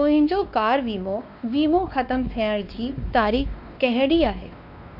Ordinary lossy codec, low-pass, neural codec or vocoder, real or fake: none; 5.4 kHz; codec, 16 kHz, 2 kbps, X-Codec, WavLM features, trained on Multilingual LibriSpeech; fake